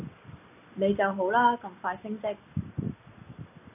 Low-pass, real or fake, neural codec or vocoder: 3.6 kHz; real; none